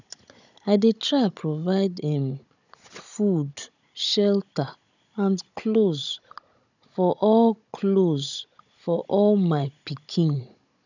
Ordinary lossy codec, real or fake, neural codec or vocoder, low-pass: none; fake; codec, 16 kHz, 16 kbps, FunCodec, trained on Chinese and English, 50 frames a second; 7.2 kHz